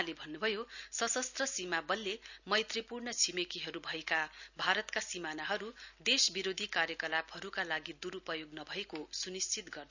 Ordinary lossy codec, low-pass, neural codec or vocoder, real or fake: none; 7.2 kHz; none; real